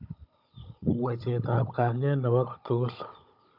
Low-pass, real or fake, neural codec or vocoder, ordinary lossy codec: 5.4 kHz; fake; codec, 16 kHz, 16 kbps, FunCodec, trained on Chinese and English, 50 frames a second; none